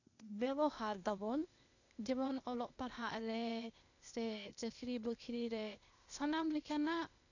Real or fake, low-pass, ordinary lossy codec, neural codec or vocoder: fake; 7.2 kHz; none; codec, 16 kHz, 0.8 kbps, ZipCodec